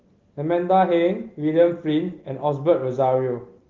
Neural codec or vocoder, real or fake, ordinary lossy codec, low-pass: none; real; Opus, 16 kbps; 7.2 kHz